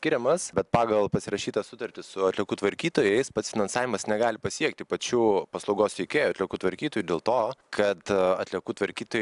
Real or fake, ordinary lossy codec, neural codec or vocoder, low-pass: real; AAC, 96 kbps; none; 10.8 kHz